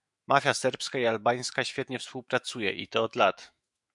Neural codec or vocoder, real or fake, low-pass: autoencoder, 48 kHz, 128 numbers a frame, DAC-VAE, trained on Japanese speech; fake; 10.8 kHz